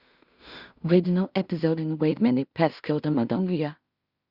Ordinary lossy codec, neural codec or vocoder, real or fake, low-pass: Opus, 64 kbps; codec, 16 kHz in and 24 kHz out, 0.4 kbps, LongCat-Audio-Codec, two codebook decoder; fake; 5.4 kHz